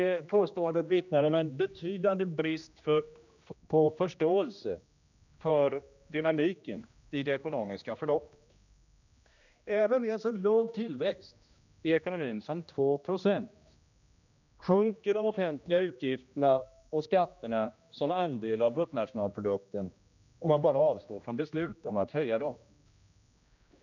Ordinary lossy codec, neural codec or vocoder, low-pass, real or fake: none; codec, 16 kHz, 1 kbps, X-Codec, HuBERT features, trained on general audio; 7.2 kHz; fake